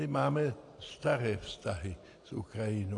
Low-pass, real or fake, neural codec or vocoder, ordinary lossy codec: 10.8 kHz; real; none; AAC, 48 kbps